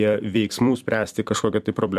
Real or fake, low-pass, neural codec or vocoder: fake; 14.4 kHz; vocoder, 44.1 kHz, 128 mel bands every 256 samples, BigVGAN v2